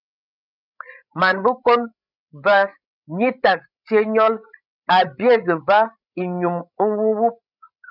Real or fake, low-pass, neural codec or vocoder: fake; 5.4 kHz; codec, 16 kHz, 16 kbps, FreqCodec, larger model